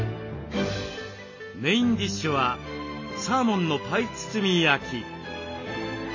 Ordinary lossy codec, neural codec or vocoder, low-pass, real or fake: MP3, 32 kbps; none; 7.2 kHz; real